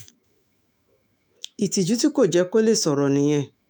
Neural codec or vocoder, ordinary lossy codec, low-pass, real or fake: autoencoder, 48 kHz, 128 numbers a frame, DAC-VAE, trained on Japanese speech; none; none; fake